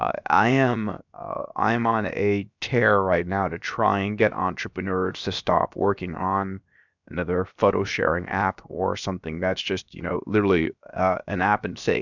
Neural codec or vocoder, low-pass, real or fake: codec, 16 kHz, 0.7 kbps, FocalCodec; 7.2 kHz; fake